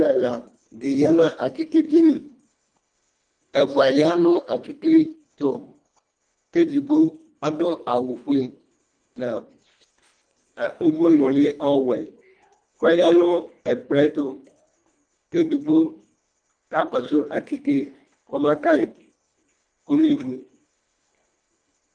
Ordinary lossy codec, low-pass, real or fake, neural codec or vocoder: Opus, 32 kbps; 9.9 kHz; fake; codec, 24 kHz, 1.5 kbps, HILCodec